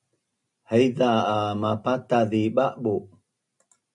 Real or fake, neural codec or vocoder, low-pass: real; none; 10.8 kHz